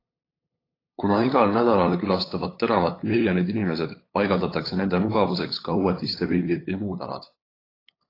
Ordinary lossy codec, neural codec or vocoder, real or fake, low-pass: AAC, 24 kbps; codec, 16 kHz, 8 kbps, FunCodec, trained on LibriTTS, 25 frames a second; fake; 5.4 kHz